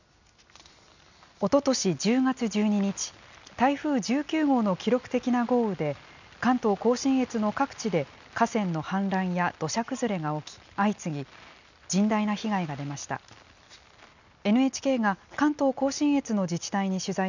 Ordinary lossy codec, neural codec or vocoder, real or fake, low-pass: none; none; real; 7.2 kHz